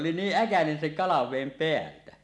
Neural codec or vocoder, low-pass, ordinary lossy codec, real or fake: none; none; none; real